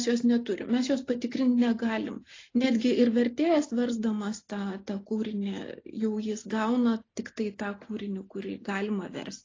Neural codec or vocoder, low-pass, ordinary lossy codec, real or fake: none; 7.2 kHz; AAC, 32 kbps; real